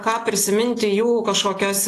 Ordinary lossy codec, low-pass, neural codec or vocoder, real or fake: AAC, 48 kbps; 14.4 kHz; none; real